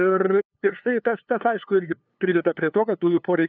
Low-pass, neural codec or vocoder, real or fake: 7.2 kHz; codec, 16 kHz, 2 kbps, FunCodec, trained on LibriTTS, 25 frames a second; fake